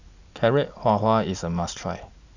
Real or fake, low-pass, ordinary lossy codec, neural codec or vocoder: real; 7.2 kHz; none; none